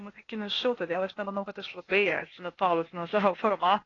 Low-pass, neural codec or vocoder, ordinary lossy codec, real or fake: 7.2 kHz; codec, 16 kHz, 0.8 kbps, ZipCodec; AAC, 32 kbps; fake